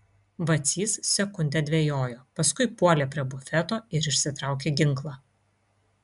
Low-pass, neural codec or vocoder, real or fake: 10.8 kHz; none; real